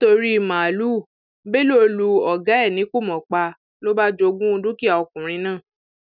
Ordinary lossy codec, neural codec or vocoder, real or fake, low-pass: none; none; real; 5.4 kHz